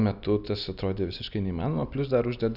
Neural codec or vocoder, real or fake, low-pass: none; real; 5.4 kHz